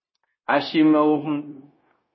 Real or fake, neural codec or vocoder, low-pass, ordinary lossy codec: fake; codec, 16 kHz, 0.9 kbps, LongCat-Audio-Codec; 7.2 kHz; MP3, 24 kbps